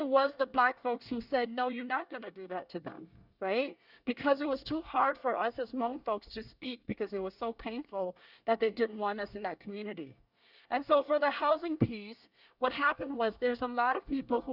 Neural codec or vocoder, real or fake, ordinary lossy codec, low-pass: codec, 24 kHz, 1 kbps, SNAC; fake; Opus, 64 kbps; 5.4 kHz